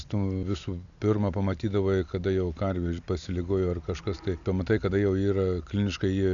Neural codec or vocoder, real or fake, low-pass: none; real; 7.2 kHz